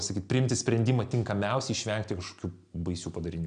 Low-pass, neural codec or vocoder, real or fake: 9.9 kHz; none; real